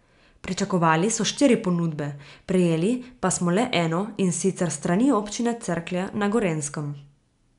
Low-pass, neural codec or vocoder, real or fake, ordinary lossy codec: 10.8 kHz; none; real; MP3, 96 kbps